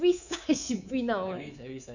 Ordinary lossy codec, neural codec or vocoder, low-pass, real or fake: none; codec, 24 kHz, 3.1 kbps, DualCodec; 7.2 kHz; fake